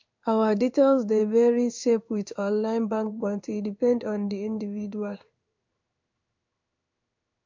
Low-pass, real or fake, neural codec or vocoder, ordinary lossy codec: 7.2 kHz; fake; codec, 16 kHz in and 24 kHz out, 1 kbps, XY-Tokenizer; MP3, 64 kbps